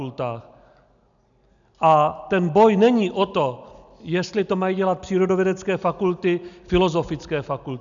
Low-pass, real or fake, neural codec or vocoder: 7.2 kHz; real; none